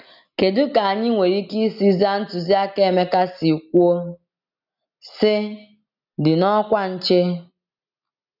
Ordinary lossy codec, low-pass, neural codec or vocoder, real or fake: none; 5.4 kHz; none; real